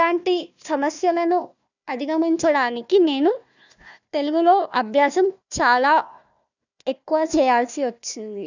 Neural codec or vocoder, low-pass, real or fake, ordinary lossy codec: codec, 16 kHz, 1 kbps, FunCodec, trained on Chinese and English, 50 frames a second; 7.2 kHz; fake; none